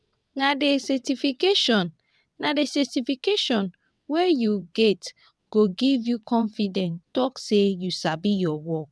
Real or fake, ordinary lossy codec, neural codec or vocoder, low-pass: fake; none; vocoder, 22.05 kHz, 80 mel bands, WaveNeXt; none